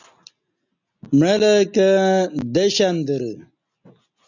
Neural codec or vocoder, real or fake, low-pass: none; real; 7.2 kHz